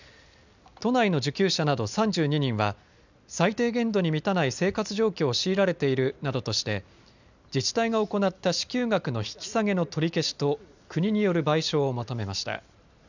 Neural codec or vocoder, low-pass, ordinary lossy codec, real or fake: none; 7.2 kHz; none; real